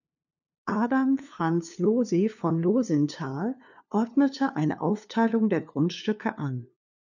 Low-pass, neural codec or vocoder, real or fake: 7.2 kHz; codec, 16 kHz, 2 kbps, FunCodec, trained on LibriTTS, 25 frames a second; fake